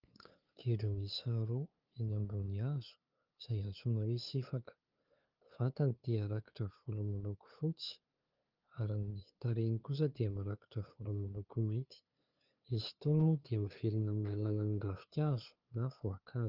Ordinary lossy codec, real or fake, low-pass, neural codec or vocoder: Opus, 32 kbps; fake; 5.4 kHz; codec, 16 kHz, 2 kbps, FunCodec, trained on LibriTTS, 25 frames a second